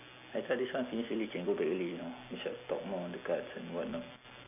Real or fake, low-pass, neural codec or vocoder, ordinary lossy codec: real; 3.6 kHz; none; none